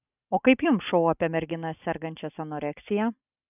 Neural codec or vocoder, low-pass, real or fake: none; 3.6 kHz; real